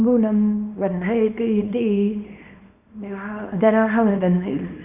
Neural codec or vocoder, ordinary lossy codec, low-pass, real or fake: codec, 24 kHz, 0.9 kbps, WavTokenizer, small release; none; 3.6 kHz; fake